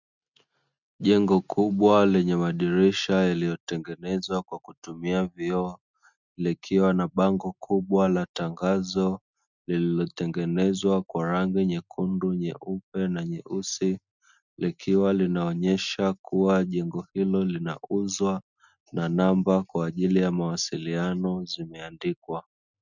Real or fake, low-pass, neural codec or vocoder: real; 7.2 kHz; none